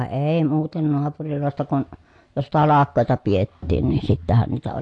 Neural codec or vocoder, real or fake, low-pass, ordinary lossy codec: none; real; 10.8 kHz; AAC, 48 kbps